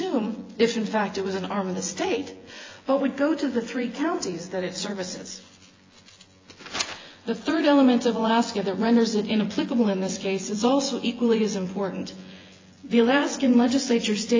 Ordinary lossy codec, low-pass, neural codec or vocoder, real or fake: AAC, 32 kbps; 7.2 kHz; vocoder, 24 kHz, 100 mel bands, Vocos; fake